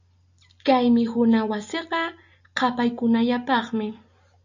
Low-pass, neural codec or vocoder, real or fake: 7.2 kHz; none; real